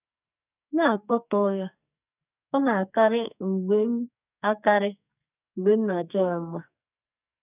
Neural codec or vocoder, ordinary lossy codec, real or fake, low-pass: codec, 44.1 kHz, 2.6 kbps, SNAC; none; fake; 3.6 kHz